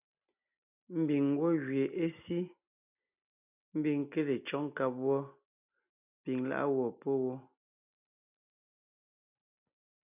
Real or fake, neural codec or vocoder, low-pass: real; none; 3.6 kHz